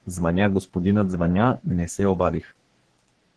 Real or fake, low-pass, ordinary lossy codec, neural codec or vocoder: fake; 10.8 kHz; Opus, 16 kbps; codec, 44.1 kHz, 3.4 kbps, Pupu-Codec